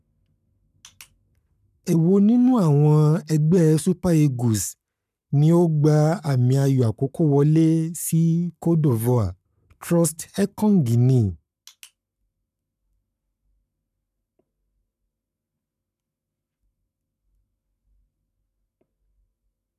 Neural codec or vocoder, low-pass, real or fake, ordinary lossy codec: codec, 44.1 kHz, 7.8 kbps, Pupu-Codec; 14.4 kHz; fake; none